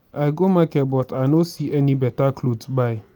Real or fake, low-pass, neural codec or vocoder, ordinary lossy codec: real; none; none; none